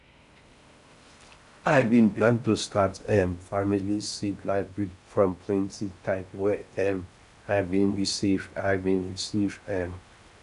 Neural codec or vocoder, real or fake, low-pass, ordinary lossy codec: codec, 16 kHz in and 24 kHz out, 0.6 kbps, FocalCodec, streaming, 4096 codes; fake; 10.8 kHz; none